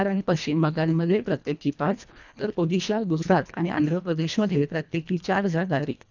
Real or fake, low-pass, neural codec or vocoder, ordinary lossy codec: fake; 7.2 kHz; codec, 24 kHz, 1.5 kbps, HILCodec; none